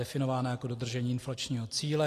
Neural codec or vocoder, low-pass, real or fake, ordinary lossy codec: none; 14.4 kHz; real; AAC, 48 kbps